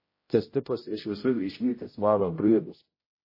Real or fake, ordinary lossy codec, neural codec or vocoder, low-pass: fake; MP3, 24 kbps; codec, 16 kHz, 0.5 kbps, X-Codec, HuBERT features, trained on general audio; 5.4 kHz